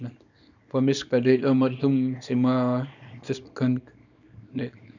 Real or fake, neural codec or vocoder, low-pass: fake; codec, 24 kHz, 0.9 kbps, WavTokenizer, small release; 7.2 kHz